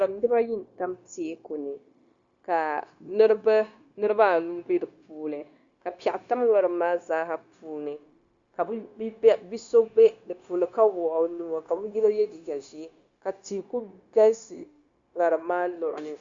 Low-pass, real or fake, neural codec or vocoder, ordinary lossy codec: 7.2 kHz; fake; codec, 16 kHz, 0.9 kbps, LongCat-Audio-Codec; Opus, 64 kbps